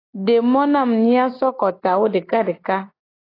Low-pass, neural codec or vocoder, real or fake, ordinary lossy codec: 5.4 kHz; none; real; AAC, 24 kbps